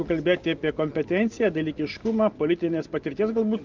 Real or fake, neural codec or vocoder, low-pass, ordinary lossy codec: real; none; 7.2 kHz; Opus, 24 kbps